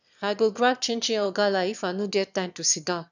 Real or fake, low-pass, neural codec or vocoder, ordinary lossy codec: fake; 7.2 kHz; autoencoder, 22.05 kHz, a latent of 192 numbers a frame, VITS, trained on one speaker; none